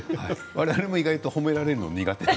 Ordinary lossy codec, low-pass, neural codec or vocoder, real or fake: none; none; none; real